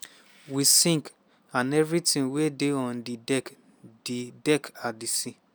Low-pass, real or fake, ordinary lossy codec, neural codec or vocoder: none; real; none; none